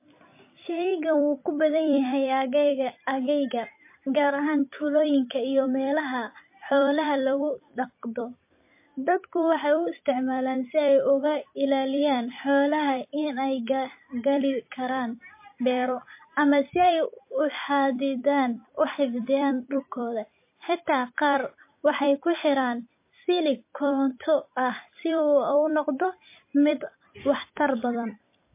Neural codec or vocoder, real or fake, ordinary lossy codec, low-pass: vocoder, 44.1 kHz, 128 mel bands every 256 samples, BigVGAN v2; fake; MP3, 24 kbps; 3.6 kHz